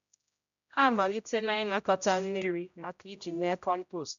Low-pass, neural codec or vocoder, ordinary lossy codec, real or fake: 7.2 kHz; codec, 16 kHz, 0.5 kbps, X-Codec, HuBERT features, trained on general audio; MP3, 96 kbps; fake